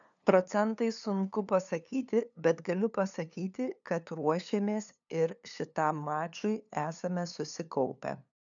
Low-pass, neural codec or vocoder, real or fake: 7.2 kHz; codec, 16 kHz, 2 kbps, FunCodec, trained on LibriTTS, 25 frames a second; fake